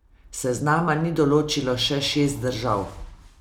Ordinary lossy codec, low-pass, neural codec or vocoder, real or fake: none; 19.8 kHz; none; real